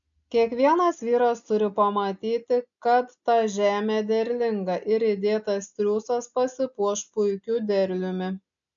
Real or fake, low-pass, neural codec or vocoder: real; 7.2 kHz; none